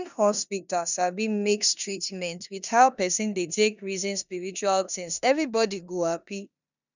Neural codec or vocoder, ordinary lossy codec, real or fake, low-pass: codec, 16 kHz in and 24 kHz out, 0.9 kbps, LongCat-Audio-Codec, four codebook decoder; none; fake; 7.2 kHz